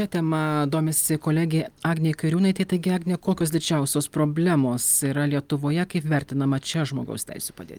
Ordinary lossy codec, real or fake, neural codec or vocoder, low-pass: Opus, 32 kbps; real; none; 19.8 kHz